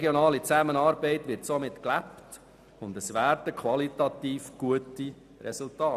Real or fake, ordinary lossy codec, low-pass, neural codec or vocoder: real; none; 14.4 kHz; none